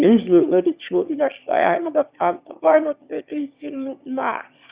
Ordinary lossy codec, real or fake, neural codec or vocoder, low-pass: Opus, 64 kbps; fake; autoencoder, 22.05 kHz, a latent of 192 numbers a frame, VITS, trained on one speaker; 3.6 kHz